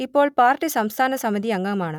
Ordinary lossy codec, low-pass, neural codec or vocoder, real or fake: none; 19.8 kHz; none; real